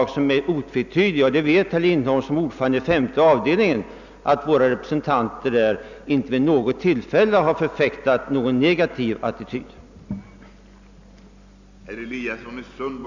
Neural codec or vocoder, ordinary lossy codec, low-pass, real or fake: none; none; 7.2 kHz; real